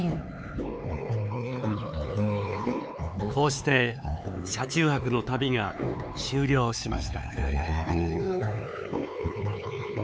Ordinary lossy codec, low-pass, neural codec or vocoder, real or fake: none; none; codec, 16 kHz, 4 kbps, X-Codec, HuBERT features, trained on LibriSpeech; fake